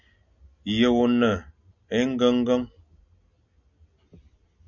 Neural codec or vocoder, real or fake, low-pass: none; real; 7.2 kHz